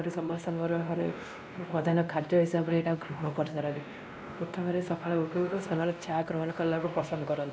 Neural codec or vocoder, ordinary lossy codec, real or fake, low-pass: codec, 16 kHz, 1 kbps, X-Codec, WavLM features, trained on Multilingual LibriSpeech; none; fake; none